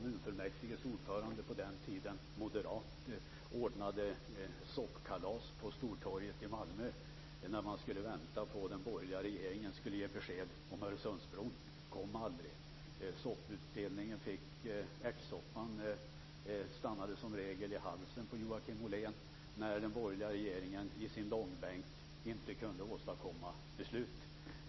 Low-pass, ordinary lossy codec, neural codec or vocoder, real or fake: 7.2 kHz; MP3, 24 kbps; none; real